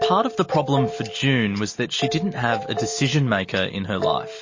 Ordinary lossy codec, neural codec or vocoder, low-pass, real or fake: MP3, 32 kbps; none; 7.2 kHz; real